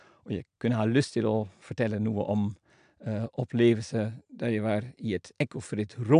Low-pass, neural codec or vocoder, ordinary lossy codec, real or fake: 9.9 kHz; none; AAC, 96 kbps; real